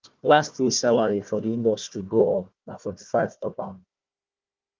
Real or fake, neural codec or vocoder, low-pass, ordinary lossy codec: fake; codec, 16 kHz, 1 kbps, FunCodec, trained on Chinese and English, 50 frames a second; 7.2 kHz; Opus, 24 kbps